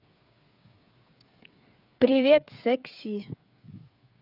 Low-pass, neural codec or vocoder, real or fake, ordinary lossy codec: 5.4 kHz; codec, 16 kHz, 8 kbps, FreqCodec, smaller model; fake; none